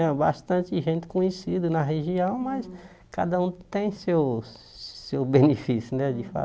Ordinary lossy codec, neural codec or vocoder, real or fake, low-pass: none; none; real; none